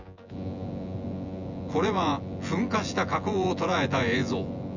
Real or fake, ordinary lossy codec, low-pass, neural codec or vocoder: fake; none; 7.2 kHz; vocoder, 24 kHz, 100 mel bands, Vocos